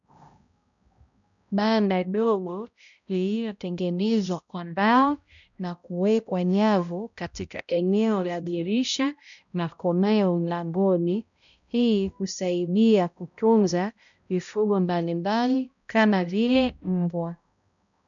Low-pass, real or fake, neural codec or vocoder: 7.2 kHz; fake; codec, 16 kHz, 0.5 kbps, X-Codec, HuBERT features, trained on balanced general audio